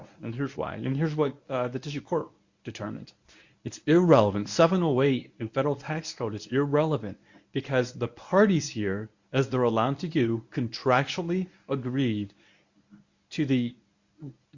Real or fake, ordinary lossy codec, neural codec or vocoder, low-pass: fake; Opus, 64 kbps; codec, 24 kHz, 0.9 kbps, WavTokenizer, medium speech release version 1; 7.2 kHz